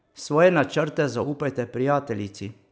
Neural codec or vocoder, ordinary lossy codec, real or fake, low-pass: none; none; real; none